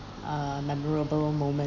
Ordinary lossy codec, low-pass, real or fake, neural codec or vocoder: none; 7.2 kHz; real; none